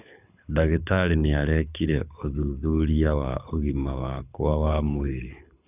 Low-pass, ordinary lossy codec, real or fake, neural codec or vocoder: 3.6 kHz; none; fake; codec, 24 kHz, 6 kbps, HILCodec